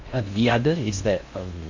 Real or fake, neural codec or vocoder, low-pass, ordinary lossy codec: fake; codec, 16 kHz in and 24 kHz out, 0.6 kbps, FocalCodec, streaming, 4096 codes; 7.2 kHz; MP3, 48 kbps